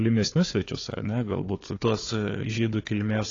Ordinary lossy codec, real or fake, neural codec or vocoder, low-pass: AAC, 32 kbps; fake; codec, 16 kHz, 4 kbps, FunCodec, trained on Chinese and English, 50 frames a second; 7.2 kHz